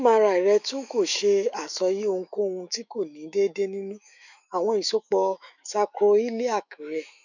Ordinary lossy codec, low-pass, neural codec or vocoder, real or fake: none; 7.2 kHz; autoencoder, 48 kHz, 128 numbers a frame, DAC-VAE, trained on Japanese speech; fake